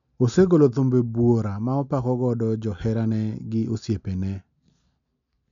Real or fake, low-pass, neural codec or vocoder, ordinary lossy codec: real; 7.2 kHz; none; none